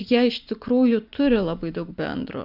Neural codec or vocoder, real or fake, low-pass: none; real; 5.4 kHz